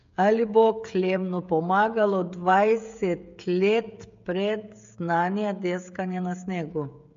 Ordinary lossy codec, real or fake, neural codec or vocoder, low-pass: MP3, 48 kbps; fake; codec, 16 kHz, 16 kbps, FreqCodec, smaller model; 7.2 kHz